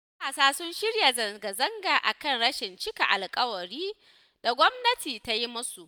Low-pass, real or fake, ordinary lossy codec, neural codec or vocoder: none; real; none; none